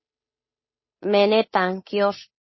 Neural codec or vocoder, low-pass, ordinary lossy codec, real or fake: codec, 16 kHz, 8 kbps, FunCodec, trained on Chinese and English, 25 frames a second; 7.2 kHz; MP3, 24 kbps; fake